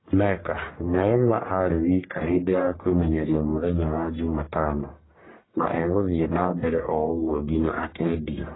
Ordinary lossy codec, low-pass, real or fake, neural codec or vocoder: AAC, 16 kbps; 7.2 kHz; fake; codec, 44.1 kHz, 1.7 kbps, Pupu-Codec